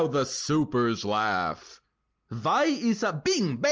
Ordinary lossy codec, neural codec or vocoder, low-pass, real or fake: Opus, 24 kbps; none; 7.2 kHz; real